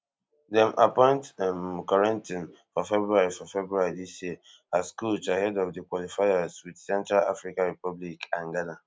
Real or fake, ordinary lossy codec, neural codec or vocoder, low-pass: real; none; none; none